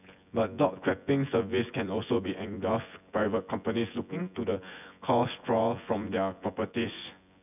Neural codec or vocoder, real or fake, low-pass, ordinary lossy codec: vocoder, 24 kHz, 100 mel bands, Vocos; fake; 3.6 kHz; none